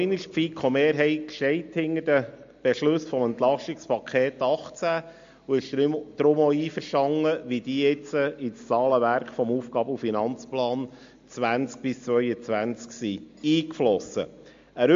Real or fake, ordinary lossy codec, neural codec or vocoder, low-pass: real; MP3, 48 kbps; none; 7.2 kHz